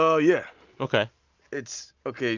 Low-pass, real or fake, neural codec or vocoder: 7.2 kHz; real; none